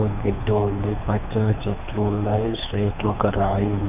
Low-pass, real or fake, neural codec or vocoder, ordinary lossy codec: 3.6 kHz; fake; codec, 24 kHz, 3 kbps, HILCodec; none